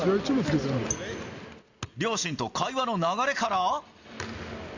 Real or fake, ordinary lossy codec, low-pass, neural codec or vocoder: real; Opus, 64 kbps; 7.2 kHz; none